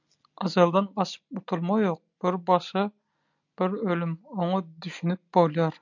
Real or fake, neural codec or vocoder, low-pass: real; none; 7.2 kHz